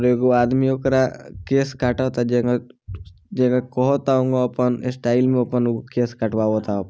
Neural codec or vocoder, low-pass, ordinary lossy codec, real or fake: none; none; none; real